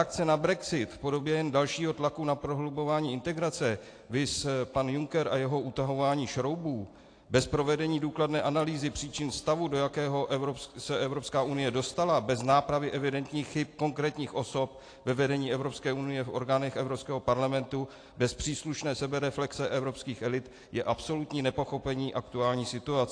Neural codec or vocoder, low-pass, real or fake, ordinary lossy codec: none; 9.9 kHz; real; AAC, 48 kbps